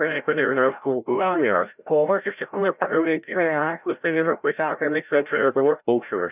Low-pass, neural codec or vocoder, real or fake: 3.6 kHz; codec, 16 kHz, 0.5 kbps, FreqCodec, larger model; fake